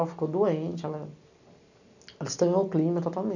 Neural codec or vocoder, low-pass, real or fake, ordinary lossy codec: none; 7.2 kHz; real; none